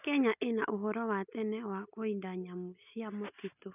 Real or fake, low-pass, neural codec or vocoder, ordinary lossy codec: real; 3.6 kHz; none; none